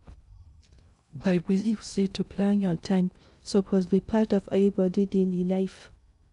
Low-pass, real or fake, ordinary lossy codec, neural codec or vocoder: 10.8 kHz; fake; none; codec, 16 kHz in and 24 kHz out, 0.6 kbps, FocalCodec, streaming, 2048 codes